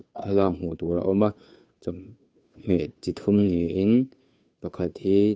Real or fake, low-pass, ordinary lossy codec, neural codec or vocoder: fake; none; none; codec, 16 kHz, 2 kbps, FunCodec, trained on Chinese and English, 25 frames a second